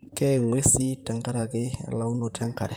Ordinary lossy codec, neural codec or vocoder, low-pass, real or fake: none; vocoder, 44.1 kHz, 128 mel bands, Pupu-Vocoder; none; fake